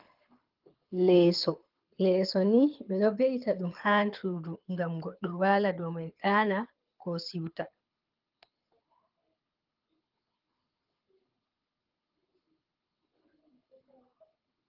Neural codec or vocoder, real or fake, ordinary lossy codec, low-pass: codec, 24 kHz, 6 kbps, HILCodec; fake; Opus, 32 kbps; 5.4 kHz